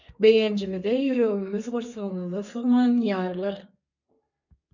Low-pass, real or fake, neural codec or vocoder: 7.2 kHz; fake; codec, 32 kHz, 1.9 kbps, SNAC